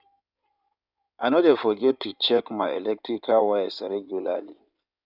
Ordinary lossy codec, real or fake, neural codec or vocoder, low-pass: none; fake; codec, 16 kHz in and 24 kHz out, 2.2 kbps, FireRedTTS-2 codec; 5.4 kHz